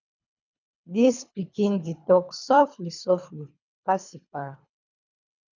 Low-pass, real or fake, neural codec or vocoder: 7.2 kHz; fake; codec, 24 kHz, 3 kbps, HILCodec